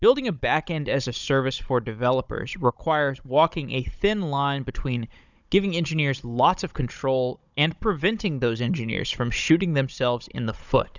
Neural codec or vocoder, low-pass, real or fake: codec, 16 kHz, 16 kbps, FunCodec, trained on Chinese and English, 50 frames a second; 7.2 kHz; fake